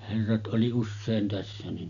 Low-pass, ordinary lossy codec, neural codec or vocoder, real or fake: 7.2 kHz; none; none; real